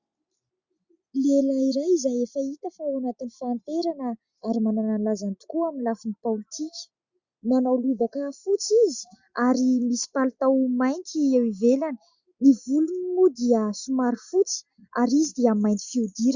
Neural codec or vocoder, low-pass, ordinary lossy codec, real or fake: none; 7.2 kHz; Opus, 64 kbps; real